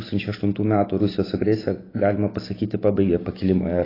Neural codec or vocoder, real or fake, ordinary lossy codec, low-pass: none; real; AAC, 24 kbps; 5.4 kHz